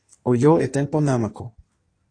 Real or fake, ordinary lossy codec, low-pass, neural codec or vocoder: fake; AAC, 48 kbps; 9.9 kHz; codec, 16 kHz in and 24 kHz out, 1.1 kbps, FireRedTTS-2 codec